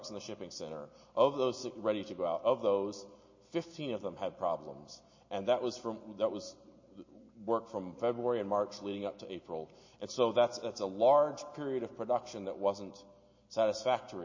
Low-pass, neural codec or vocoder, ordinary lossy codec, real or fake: 7.2 kHz; none; MP3, 32 kbps; real